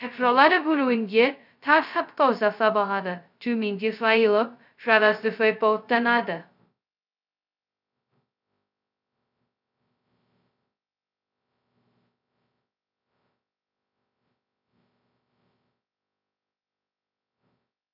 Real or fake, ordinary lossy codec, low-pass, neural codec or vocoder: fake; none; 5.4 kHz; codec, 16 kHz, 0.2 kbps, FocalCodec